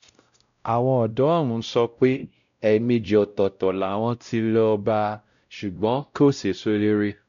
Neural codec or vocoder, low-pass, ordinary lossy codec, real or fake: codec, 16 kHz, 0.5 kbps, X-Codec, WavLM features, trained on Multilingual LibriSpeech; 7.2 kHz; none; fake